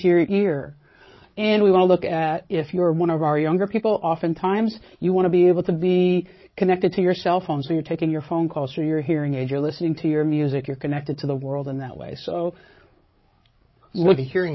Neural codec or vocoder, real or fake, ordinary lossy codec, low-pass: codec, 16 kHz, 8 kbps, FreqCodec, larger model; fake; MP3, 24 kbps; 7.2 kHz